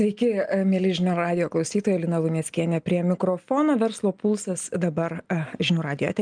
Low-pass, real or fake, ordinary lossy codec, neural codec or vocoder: 9.9 kHz; real; Opus, 32 kbps; none